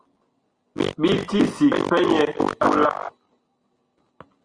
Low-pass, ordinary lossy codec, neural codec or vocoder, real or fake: 9.9 kHz; Opus, 32 kbps; none; real